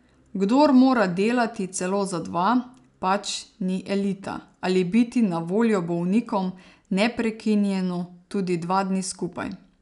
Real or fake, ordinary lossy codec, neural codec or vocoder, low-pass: real; none; none; 10.8 kHz